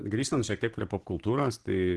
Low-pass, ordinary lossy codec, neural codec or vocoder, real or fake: 10.8 kHz; Opus, 16 kbps; vocoder, 44.1 kHz, 128 mel bands every 512 samples, BigVGAN v2; fake